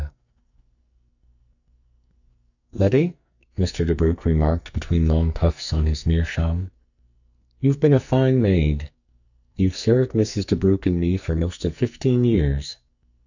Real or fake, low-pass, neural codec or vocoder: fake; 7.2 kHz; codec, 44.1 kHz, 2.6 kbps, SNAC